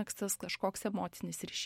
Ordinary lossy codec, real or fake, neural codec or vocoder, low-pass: MP3, 64 kbps; real; none; 19.8 kHz